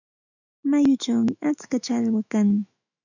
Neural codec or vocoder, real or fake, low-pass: autoencoder, 48 kHz, 128 numbers a frame, DAC-VAE, trained on Japanese speech; fake; 7.2 kHz